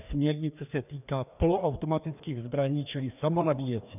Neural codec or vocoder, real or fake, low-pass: codec, 16 kHz, 2 kbps, FreqCodec, larger model; fake; 3.6 kHz